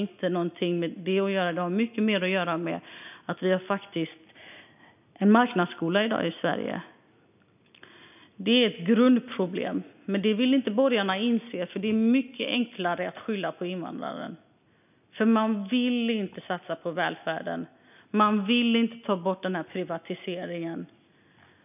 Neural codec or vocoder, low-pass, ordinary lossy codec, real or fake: none; 3.6 kHz; none; real